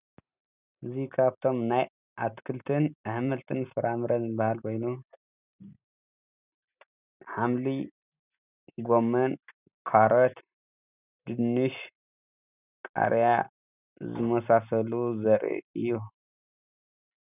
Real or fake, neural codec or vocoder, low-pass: real; none; 3.6 kHz